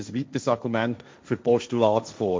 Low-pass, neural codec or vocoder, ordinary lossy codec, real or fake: none; codec, 16 kHz, 1.1 kbps, Voila-Tokenizer; none; fake